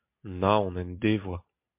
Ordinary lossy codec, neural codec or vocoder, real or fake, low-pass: MP3, 32 kbps; none; real; 3.6 kHz